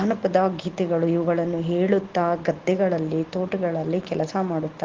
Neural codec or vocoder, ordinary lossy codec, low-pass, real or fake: none; Opus, 16 kbps; 7.2 kHz; real